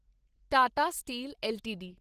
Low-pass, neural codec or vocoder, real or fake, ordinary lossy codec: 14.4 kHz; none; real; Opus, 16 kbps